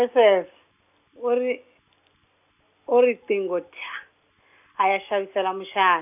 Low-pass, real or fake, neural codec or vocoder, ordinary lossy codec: 3.6 kHz; real; none; none